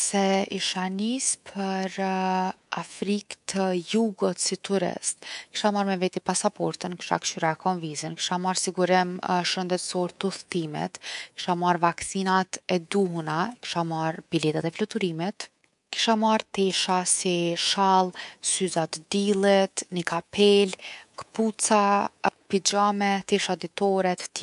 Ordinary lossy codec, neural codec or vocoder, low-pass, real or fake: AAC, 96 kbps; codec, 24 kHz, 3.1 kbps, DualCodec; 10.8 kHz; fake